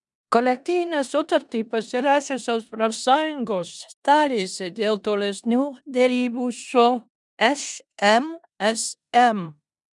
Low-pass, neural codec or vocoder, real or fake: 10.8 kHz; codec, 16 kHz in and 24 kHz out, 0.9 kbps, LongCat-Audio-Codec, four codebook decoder; fake